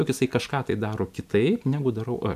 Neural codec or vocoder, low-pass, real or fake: none; 14.4 kHz; real